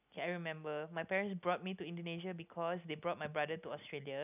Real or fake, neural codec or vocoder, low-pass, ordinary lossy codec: real; none; 3.6 kHz; none